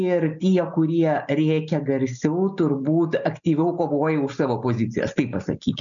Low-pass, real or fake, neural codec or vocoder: 7.2 kHz; real; none